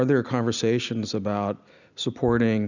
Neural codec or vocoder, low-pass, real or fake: none; 7.2 kHz; real